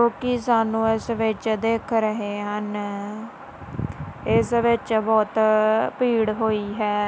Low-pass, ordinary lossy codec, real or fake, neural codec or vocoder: none; none; real; none